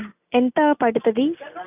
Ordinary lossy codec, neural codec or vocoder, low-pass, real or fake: none; none; 3.6 kHz; real